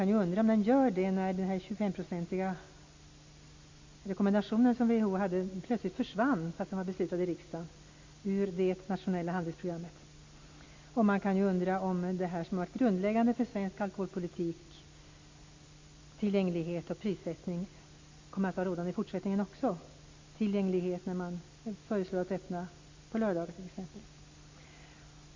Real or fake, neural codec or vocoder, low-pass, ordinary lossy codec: real; none; 7.2 kHz; MP3, 64 kbps